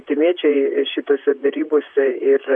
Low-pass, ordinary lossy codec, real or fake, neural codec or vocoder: 9.9 kHz; MP3, 64 kbps; fake; vocoder, 44.1 kHz, 128 mel bands, Pupu-Vocoder